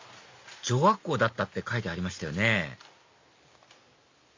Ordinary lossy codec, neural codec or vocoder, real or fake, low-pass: MP3, 48 kbps; none; real; 7.2 kHz